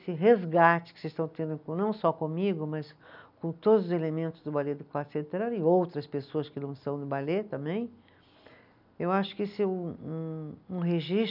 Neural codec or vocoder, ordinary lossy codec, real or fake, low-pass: none; none; real; 5.4 kHz